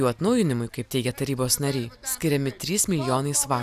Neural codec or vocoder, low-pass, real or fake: none; 14.4 kHz; real